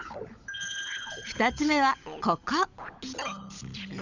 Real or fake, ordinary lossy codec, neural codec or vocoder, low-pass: fake; none; codec, 16 kHz, 16 kbps, FunCodec, trained on LibriTTS, 50 frames a second; 7.2 kHz